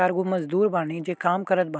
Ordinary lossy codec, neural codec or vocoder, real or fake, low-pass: none; none; real; none